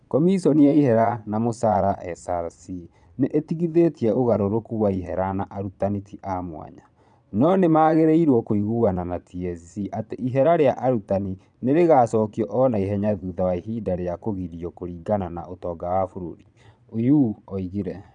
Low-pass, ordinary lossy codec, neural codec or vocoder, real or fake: 9.9 kHz; none; vocoder, 22.05 kHz, 80 mel bands, Vocos; fake